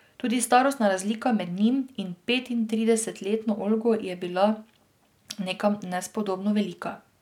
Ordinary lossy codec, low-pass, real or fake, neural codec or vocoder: none; 19.8 kHz; real; none